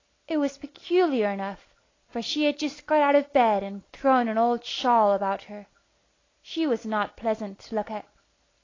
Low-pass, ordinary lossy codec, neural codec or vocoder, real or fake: 7.2 kHz; AAC, 32 kbps; none; real